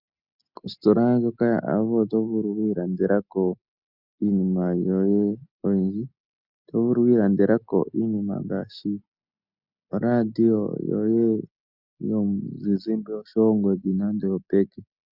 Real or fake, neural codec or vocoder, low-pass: real; none; 5.4 kHz